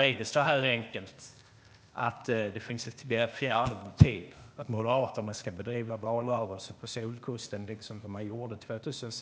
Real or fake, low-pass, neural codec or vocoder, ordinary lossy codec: fake; none; codec, 16 kHz, 0.8 kbps, ZipCodec; none